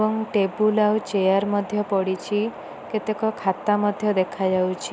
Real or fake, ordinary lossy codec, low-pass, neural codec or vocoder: real; none; none; none